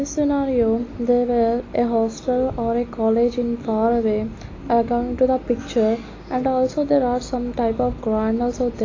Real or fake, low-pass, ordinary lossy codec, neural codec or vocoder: real; 7.2 kHz; AAC, 32 kbps; none